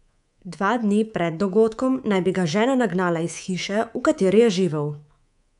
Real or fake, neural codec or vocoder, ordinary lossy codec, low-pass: fake; codec, 24 kHz, 3.1 kbps, DualCodec; none; 10.8 kHz